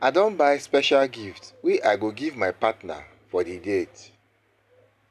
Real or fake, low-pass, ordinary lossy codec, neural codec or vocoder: real; 14.4 kHz; none; none